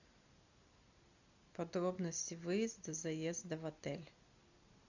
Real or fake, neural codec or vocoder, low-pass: real; none; 7.2 kHz